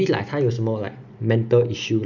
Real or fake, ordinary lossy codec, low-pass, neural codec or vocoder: real; none; 7.2 kHz; none